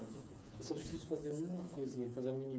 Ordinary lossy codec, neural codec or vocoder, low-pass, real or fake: none; codec, 16 kHz, 4 kbps, FreqCodec, smaller model; none; fake